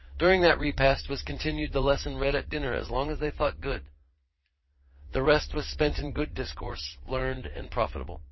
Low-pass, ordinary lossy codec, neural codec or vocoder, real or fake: 7.2 kHz; MP3, 24 kbps; vocoder, 22.05 kHz, 80 mel bands, Vocos; fake